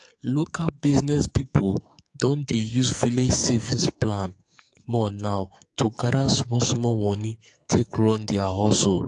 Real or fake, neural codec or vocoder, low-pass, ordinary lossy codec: fake; codec, 44.1 kHz, 2.6 kbps, SNAC; 10.8 kHz; AAC, 64 kbps